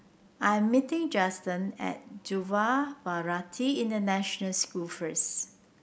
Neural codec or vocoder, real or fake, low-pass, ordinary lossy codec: none; real; none; none